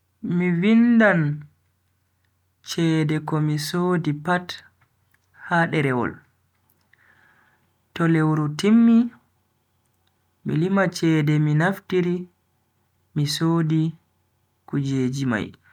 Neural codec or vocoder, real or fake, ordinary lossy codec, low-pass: none; real; none; 19.8 kHz